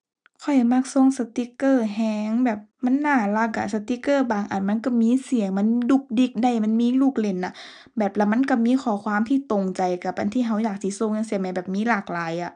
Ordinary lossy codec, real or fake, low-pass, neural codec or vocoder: none; real; 9.9 kHz; none